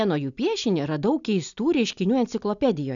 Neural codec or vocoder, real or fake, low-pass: none; real; 7.2 kHz